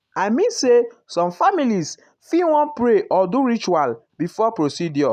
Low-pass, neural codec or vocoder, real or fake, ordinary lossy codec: 14.4 kHz; none; real; none